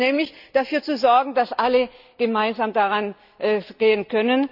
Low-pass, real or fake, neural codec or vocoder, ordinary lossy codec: 5.4 kHz; real; none; none